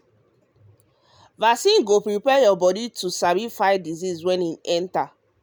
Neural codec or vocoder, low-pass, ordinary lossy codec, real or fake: none; none; none; real